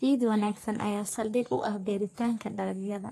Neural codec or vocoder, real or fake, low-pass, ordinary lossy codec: codec, 44.1 kHz, 3.4 kbps, Pupu-Codec; fake; 14.4 kHz; AAC, 64 kbps